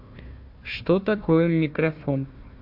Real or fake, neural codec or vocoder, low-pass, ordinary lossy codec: fake; codec, 16 kHz, 1 kbps, FunCodec, trained on Chinese and English, 50 frames a second; 5.4 kHz; MP3, 48 kbps